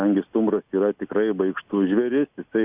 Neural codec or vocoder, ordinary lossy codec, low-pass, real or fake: none; Opus, 64 kbps; 3.6 kHz; real